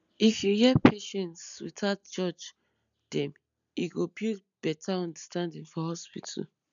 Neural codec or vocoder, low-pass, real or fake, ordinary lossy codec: none; 7.2 kHz; real; none